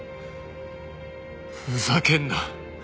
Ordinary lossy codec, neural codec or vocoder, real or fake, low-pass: none; none; real; none